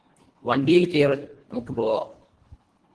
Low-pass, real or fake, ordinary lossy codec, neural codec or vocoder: 10.8 kHz; fake; Opus, 16 kbps; codec, 24 kHz, 1.5 kbps, HILCodec